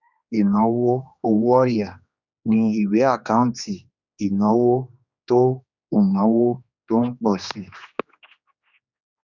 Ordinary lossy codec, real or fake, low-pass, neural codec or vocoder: Opus, 64 kbps; fake; 7.2 kHz; codec, 16 kHz, 2 kbps, X-Codec, HuBERT features, trained on general audio